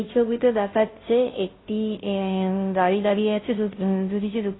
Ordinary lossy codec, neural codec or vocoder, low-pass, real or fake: AAC, 16 kbps; codec, 16 kHz, 0.5 kbps, FunCodec, trained on Chinese and English, 25 frames a second; 7.2 kHz; fake